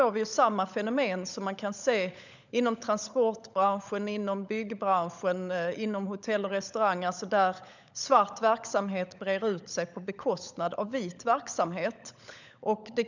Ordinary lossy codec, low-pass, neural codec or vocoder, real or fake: none; 7.2 kHz; codec, 16 kHz, 16 kbps, FunCodec, trained on LibriTTS, 50 frames a second; fake